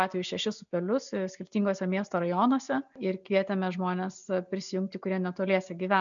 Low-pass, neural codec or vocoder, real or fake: 7.2 kHz; none; real